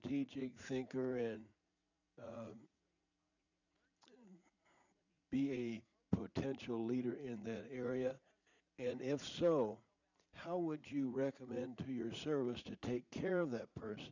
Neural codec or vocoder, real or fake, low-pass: vocoder, 44.1 kHz, 80 mel bands, Vocos; fake; 7.2 kHz